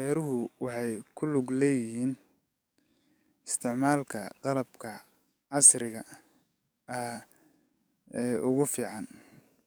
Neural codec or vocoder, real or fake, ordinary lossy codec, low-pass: codec, 44.1 kHz, 7.8 kbps, DAC; fake; none; none